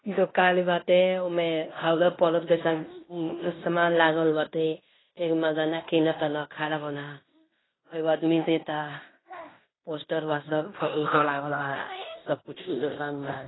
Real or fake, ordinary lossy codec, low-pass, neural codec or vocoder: fake; AAC, 16 kbps; 7.2 kHz; codec, 16 kHz in and 24 kHz out, 0.9 kbps, LongCat-Audio-Codec, fine tuned four codebook decoder